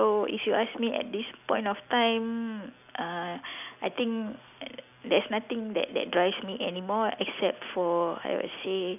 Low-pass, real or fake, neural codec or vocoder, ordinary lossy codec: 3.6 kHz; real; none; none